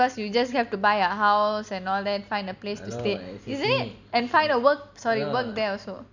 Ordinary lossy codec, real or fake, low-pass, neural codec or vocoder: none; real; 7.2 kHz; none